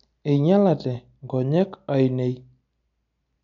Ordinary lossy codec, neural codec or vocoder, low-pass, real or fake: none; none; 7.2 kHz; real